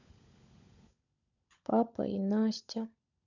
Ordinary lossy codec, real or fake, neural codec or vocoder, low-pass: none; fake; codec, 16 kHz, 16 kbps, FunCodec, trained on LibriTTS, 50 frames a second; 7.2 kHz